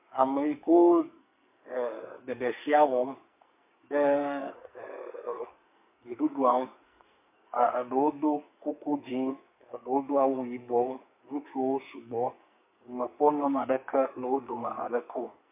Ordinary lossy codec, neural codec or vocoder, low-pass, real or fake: MP3, 32 kbps; codec, 44.1 kHz, 2.6 kbps, SNAC; 3.6 kHz; fake